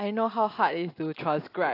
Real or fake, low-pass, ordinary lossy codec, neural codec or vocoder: real; 5.4 kHz; AAC, 32 kbps; none